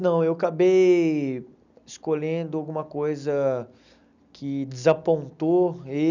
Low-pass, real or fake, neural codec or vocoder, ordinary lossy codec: 7.2 kHz; real; none; none